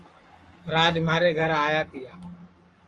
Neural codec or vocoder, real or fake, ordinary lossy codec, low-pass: codec, 44.1 kHz, 7.8 kbps, Pupu-Codec; fake; Opus, 32 kbps; 10.8 kHz